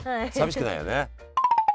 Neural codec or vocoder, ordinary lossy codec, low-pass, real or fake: none; none; none; real